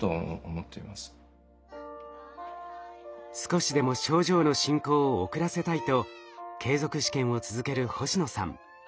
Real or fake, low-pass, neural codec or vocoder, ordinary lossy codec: real; none; none; none